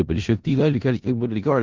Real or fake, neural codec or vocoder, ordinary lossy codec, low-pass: fake; codec, 16 kHz in and 24 kHz out, 0.4 kbps, LongCat-Audio-Codec, four codebook decoder; Opus, 32 kbps; 7.2 kHz